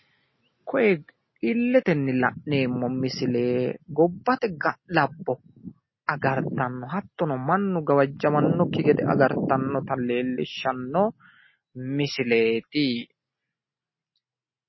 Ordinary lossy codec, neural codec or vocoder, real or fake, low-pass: MP3, 24 kbps; none; real; 7.2 kHz